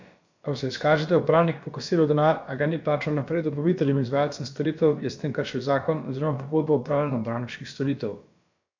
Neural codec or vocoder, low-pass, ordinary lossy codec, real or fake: codec, 16 kHz, about 1 kbps, DyCAST, with the encoder's durations; 7.2 kHz; MP3, 64 kbps; fake